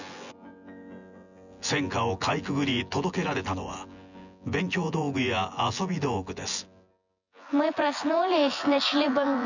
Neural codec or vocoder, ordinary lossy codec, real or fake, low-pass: vocoder, 24 kHz, 100 mel bands, Vocos; none; fake; 7.2 kHz